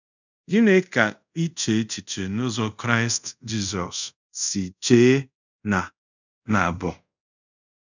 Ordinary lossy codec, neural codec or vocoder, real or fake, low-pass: none; codec, 24 kHz, 0.5 kbps, DualCodec; fake; 7.2 kHz